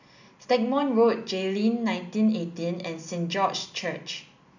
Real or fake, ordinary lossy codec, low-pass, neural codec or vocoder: real; none; 7.2 kHz; none